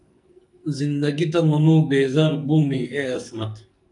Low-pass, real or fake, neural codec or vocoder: 10.8 kHz; fake; codec, 44.1 kHz, 2.6 kbps, SNAC